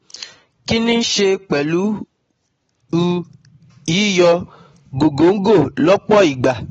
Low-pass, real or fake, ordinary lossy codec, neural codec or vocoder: 10.8 kHz; real; AAC, 24 kbps; none